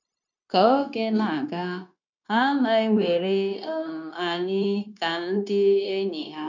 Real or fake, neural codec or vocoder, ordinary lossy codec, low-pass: fake; codec, 16 kHz, 0.9 kbps, LongCat-Audio-Codec; none; 7.2 kHz